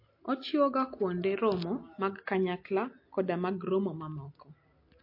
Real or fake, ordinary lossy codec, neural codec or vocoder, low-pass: real; MP3, 32 kbps; none; 5.4 kHz